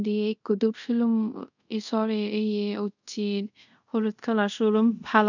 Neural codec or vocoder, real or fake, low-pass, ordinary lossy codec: codec, 24 kHz, 0.5 kbps, DualCodec; fake; 7.2 kHz; none